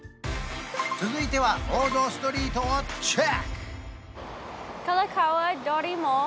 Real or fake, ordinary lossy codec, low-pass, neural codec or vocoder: real; none; none; none